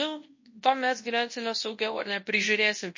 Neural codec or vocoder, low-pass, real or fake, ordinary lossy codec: codec, 24 kHz, 0.9 kbps, WavTokenizer, large speech release; 7.2 kHz; fake; MP3, 32 kbps